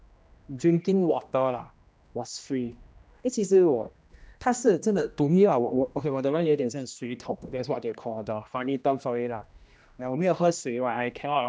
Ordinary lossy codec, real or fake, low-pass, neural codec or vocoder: none; fake; none; codec, 16 kHz, 1 kbps, X-Codec, HuBERT features, trained on general audio